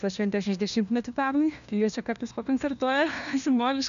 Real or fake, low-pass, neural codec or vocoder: fake; 7.2 kHz; codec, 16 kHz, 1 kbps, FunCodec, trained on LibriTTS, 50 frames a second